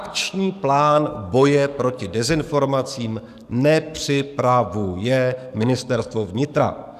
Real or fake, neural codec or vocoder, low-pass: fake; codec, 44.1 kHz, 7.8 kbps, DAC; 14.4 kHz